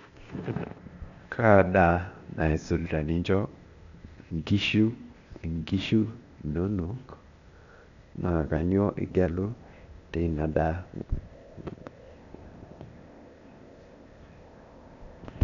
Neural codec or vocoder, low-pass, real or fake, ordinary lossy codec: codec, 16 kHz, 0.8 kbps, ZipCodec; 7.2 kHz; fake; none